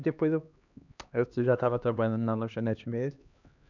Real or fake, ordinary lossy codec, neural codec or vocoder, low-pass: fake; none; codec, 16 kHz, 2 kbps, X-Codec, HuBERT features, trained on LibriSpeech; 7.2 kHz